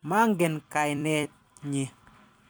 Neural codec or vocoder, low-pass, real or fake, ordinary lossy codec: vocoder, 44.1 kHz, 128 mel bands every 256 samples, BigVGAN v2; none; fake; none